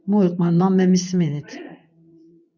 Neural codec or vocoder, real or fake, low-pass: vocoder, 24 kHz, 100 mel bands, Vocos; fake; 7.2 kHz